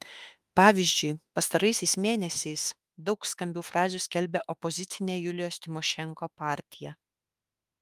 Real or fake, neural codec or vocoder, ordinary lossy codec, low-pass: fake; autoencoder, 48 kHz, 32 numbers a frame, DAC-VAE, trained on Japanese speech; Opus, 32 kbps; 14.4 kHz